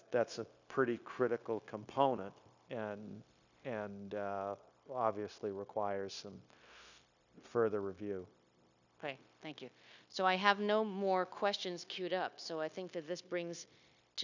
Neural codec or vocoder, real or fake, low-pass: codec, 16 kHz, 0.9 kbps, LongCat-Audio-Codec; fake; 7.2 kHz